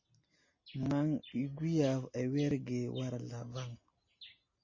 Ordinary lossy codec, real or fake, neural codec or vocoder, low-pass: MP3, 32 kbps; real; none; 7.2 kHz